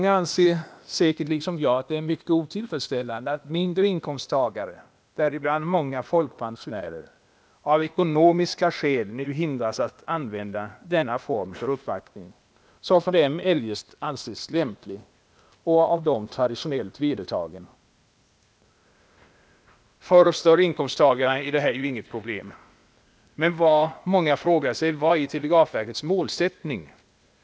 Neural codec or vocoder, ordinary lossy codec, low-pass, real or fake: codec, 16 kHz, 0.8 kbps, ZipCodec; none; none; fake